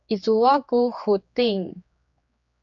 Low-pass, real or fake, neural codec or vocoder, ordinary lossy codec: 7.2 kHz; fake; codec, 16 kHz, 4 kbps, X-Codec, HuBERT features, trained on general audio; AAC, 48 kbps